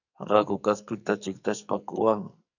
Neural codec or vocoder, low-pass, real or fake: codec, 44.1 kHz, 2.6 kbps, SNAC; 7.2 kHz; fake